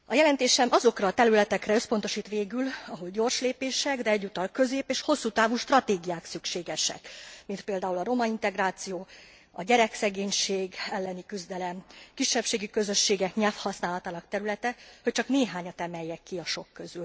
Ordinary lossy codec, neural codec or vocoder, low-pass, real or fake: none; none; none; real